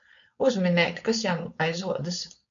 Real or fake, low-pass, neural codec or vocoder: fake; 7.2 kHz; codec, 16 kHz, 4.8 kbps, FACodec